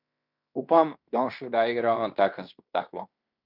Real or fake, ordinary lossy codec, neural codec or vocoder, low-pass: fake; none; codec, 16 kHz in and 24 kHz out, 0.9 kbps, LongCat-Audio-Codec, fine tuned four codebook decoder; 5.4 kHz